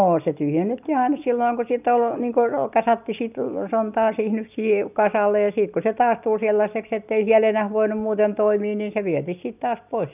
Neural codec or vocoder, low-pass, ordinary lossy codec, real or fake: none; 3.6 kHz; none; real